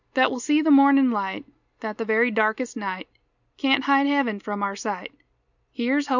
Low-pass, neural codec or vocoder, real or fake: 7.2 kHz; none; real